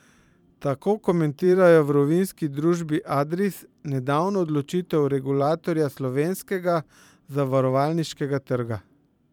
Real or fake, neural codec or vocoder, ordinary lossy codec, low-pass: fake; vocoder, 44.1 kHz, 128 mel bands every 512 samples, BigVGAN v2; none; 19.8 kHz